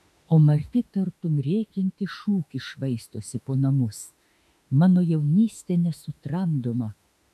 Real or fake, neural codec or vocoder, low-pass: fake; autoencoder, 48 kHz, 32 numbers a frame, DAC-VAE, trained on Japanese speech; 14.4 kHz